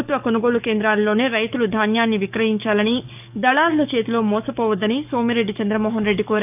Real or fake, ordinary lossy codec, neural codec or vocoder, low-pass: fake; none; codec, 44.1 kHz, 7.8 kbps, DAC; 3.6 kHz